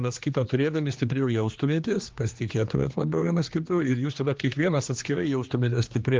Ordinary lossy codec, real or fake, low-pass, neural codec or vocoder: Opus, 24 kbps; fake; 7.2 kHz; codec, 16 kHz, 2 kbps, X-Codec, HuBERT features, trained on general audio